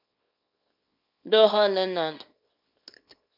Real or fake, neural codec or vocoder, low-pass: fake; codec, 24 kHz, 0.9 kbps, WavTokenizer, small release; 5.4 kHz